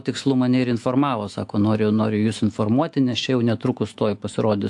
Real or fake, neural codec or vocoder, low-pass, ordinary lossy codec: fake; autoencoder, 48 kHz, 128 numbers a frame, DAC-VAE, trained on Japanese speech; 10.8 kHz; AAC, 64 kbps